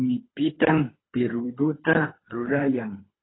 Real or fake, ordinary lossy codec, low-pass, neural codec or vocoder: fake; AAC, 16 kbps; 7.2 kHz; codec, 24 kHz, 3 kbps, HILCodec